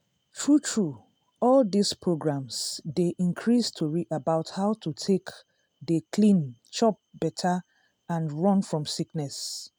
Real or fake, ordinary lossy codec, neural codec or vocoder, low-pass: real; none; none; 19.8 kHz